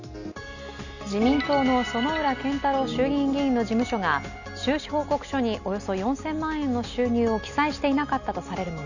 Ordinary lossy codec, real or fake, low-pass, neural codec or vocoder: none; real; 7.2 kHz; none